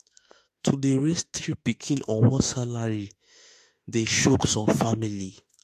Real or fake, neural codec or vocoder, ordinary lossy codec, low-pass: fake; autoencoder, 48 kHz, 32 numbers a frame, DAC-VAE, trained on Japanese speech; AAC, 64 kbps; 14.4 kHz